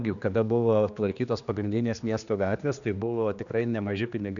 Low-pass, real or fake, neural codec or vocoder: 7.2 kHz; fake; codec, 16 kHz, 2 kbps, X-Codec, HuBERT features, trained on general audio